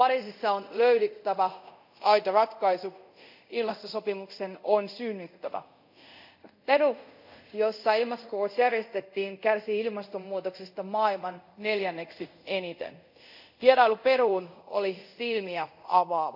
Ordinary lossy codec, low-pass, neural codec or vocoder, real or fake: none; 5.4 kHz; codec, 24 kHz, 0.5 kbps, DualCodec; fake